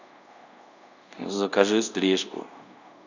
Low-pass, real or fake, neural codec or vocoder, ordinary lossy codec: 7.2 kHz; fake; codec, 24 kHz, 0.5 kbps, DualCodec; none